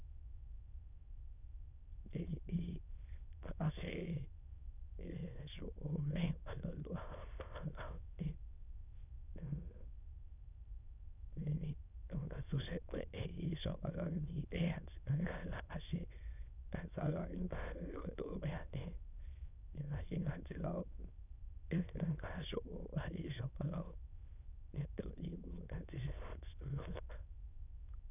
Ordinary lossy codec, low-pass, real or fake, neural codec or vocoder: none; 3.6 kHz; fake; autoencoder, 22.05 kHz, a latent of 192 numbers a frame, VITS, trained on many speakers